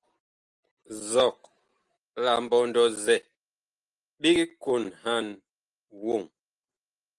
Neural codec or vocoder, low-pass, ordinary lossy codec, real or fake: none; 10.8 kHz; Opus, 24 kbps; real